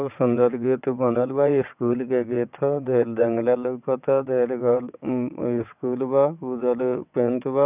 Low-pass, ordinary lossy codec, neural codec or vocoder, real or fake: 3.6 kHz; none; vocoder, 22.05 kHz, 80 mel bands, WaveNeXt; fake